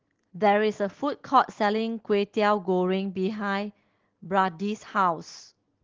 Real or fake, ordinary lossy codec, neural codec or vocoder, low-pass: real; Opus, 16 kbps; none; 7.2 kHz